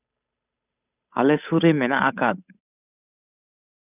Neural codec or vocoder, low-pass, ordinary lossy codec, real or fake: codec, 16 kHz, 8 kbps, FunCodec, trained on Chinese and English, 25 frames a second; 3.6 kHz; none; fake